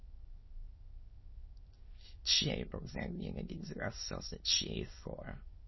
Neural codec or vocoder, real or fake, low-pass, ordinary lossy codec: autoencoder, 22.05 kHz, a latent of 192 numbers a frame, VITS, trained on many speakers; fake; 7.2 kHz; MP3, 24 kbps